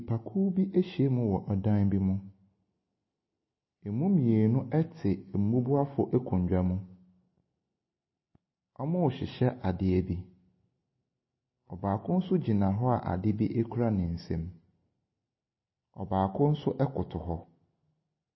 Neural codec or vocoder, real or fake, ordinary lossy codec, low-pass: none; real; MP3, 24 kbps; 7.2 kHz